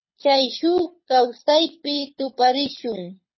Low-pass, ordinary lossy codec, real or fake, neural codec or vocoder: 7.2 kHz; MP3, 24 kbps; fake; codec, 24 kHz, 6 kbps, HILCodec